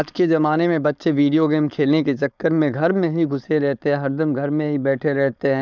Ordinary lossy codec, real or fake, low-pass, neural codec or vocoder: none; fake; 7.2 kHz; codec, 16 kHz, 4.8 kbps, FACodec